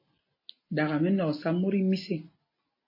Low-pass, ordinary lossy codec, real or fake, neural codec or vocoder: 5.4 kHz; MP3, 24 kbps; real; none